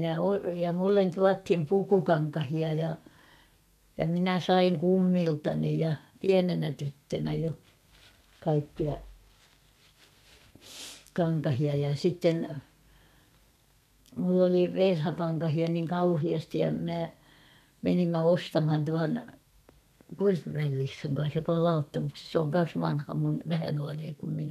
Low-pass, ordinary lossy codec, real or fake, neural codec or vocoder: 14.4 kHz; none; fake; codec, 32 kHz, 1.9 kbps, SNAC